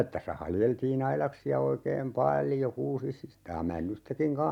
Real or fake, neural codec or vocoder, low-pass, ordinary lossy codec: real; none; 19.8 kHz; none